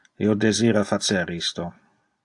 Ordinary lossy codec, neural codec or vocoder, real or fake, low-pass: AAC, 64 kbps; none; real; 10.8 kHz